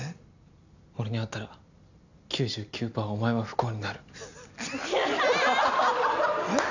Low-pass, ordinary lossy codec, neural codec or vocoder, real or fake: 7.2 kHz; none; none; real